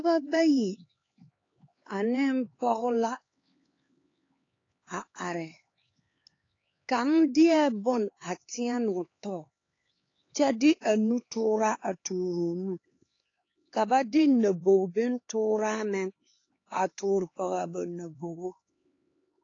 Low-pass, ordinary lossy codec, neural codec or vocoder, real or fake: 7.2 kHz; AAC, 32 kbps; codec, 16 kHz, 4 kbps, X-Codec, HuBERT features, trained on LibriSpeech; fake